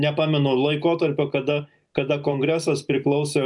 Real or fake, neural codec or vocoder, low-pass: real; none; 10.8 kHz